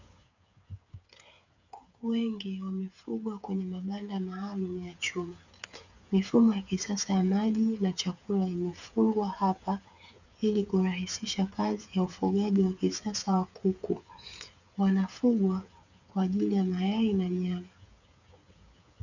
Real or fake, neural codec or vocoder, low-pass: fake; codec, 16 kHz, 8 kbps, FreqCodec, smaller model; 7.2 kHz